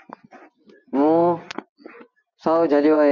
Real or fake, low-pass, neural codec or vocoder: fake; 7.2 kHz; vocoder, 24 kHz, 100 mel bands, Vocos